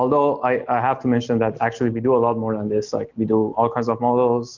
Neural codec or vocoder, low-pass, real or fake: none; 7.2 kHz; real